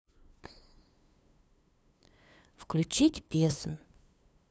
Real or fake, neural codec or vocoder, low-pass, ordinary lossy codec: fake; codec, 16 kHz, 2 kbps, FunCodec, trained on LibriTTS, 25 frames a second; none; none